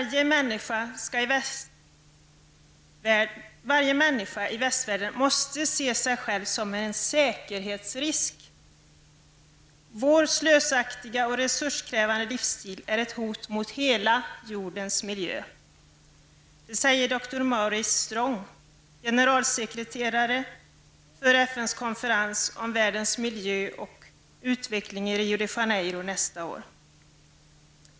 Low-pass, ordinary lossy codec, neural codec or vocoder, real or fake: none; none; none; real